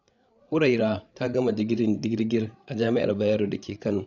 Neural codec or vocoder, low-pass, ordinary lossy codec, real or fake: codec, 16 kHz, 8 kbps, FreqCodec, larger model; 7.2 kHz; none; fake